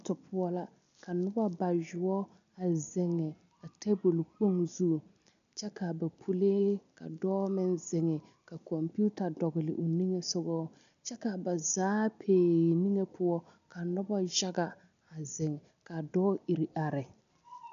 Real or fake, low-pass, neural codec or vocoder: real; 7.2 kHz; none